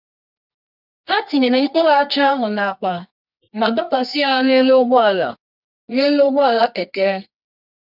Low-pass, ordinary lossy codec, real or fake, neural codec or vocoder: 5.4 kHz; none; fake; codec, 24 kHz, 0.9 kbps, WavTokenizer, medium music audio release